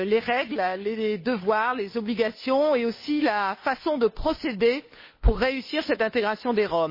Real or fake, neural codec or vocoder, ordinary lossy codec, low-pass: fake; codec, 16 kHz, 2 kbps, FunCodec, trained on Chinese and English, 25 frames a second; MP3, 24 kbps; 5.4 kHz